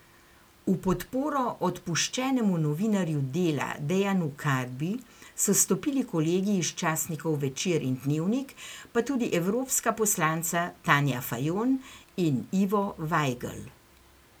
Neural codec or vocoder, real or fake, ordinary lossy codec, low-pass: none; real; none; none